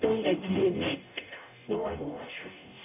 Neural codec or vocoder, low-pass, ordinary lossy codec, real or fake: codec, 44.1 kHz, 0.9 kbps, DAC; 3.6 kHz; none; fake